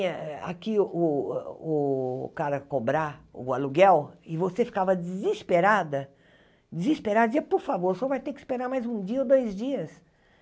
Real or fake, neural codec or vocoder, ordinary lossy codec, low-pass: real; none; none; none